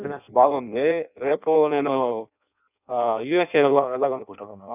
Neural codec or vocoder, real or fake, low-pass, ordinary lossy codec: codec, 16 kHz in and 24 kHz out, 0.6 kbps, FireRedTTS-2 codec; fake; 3.6 kHz; none